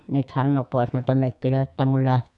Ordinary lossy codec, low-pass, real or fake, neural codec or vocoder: none; 10.8 kHz; fake; codec, 44.1 kHz, 2.6 kbps, SNAC